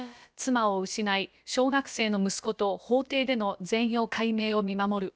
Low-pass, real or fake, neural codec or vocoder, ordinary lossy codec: none; fake; codec, 16 kHz, about 1 kbps, DyCAST, with the encoder's durations; none